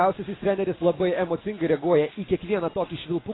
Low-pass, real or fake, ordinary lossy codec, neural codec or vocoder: 7.2 kHz; real; AAC, 16 kbps; none